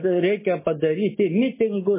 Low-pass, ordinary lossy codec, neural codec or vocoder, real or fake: 3.6 kHz; MP3, 16 kbps; none; real